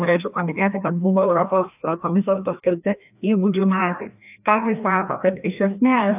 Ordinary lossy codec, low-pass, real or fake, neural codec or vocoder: AAC, 32 kbps; 3.6 kHz; fake; codec, 16 kHz, 1 kbps, FreqCodec, larger model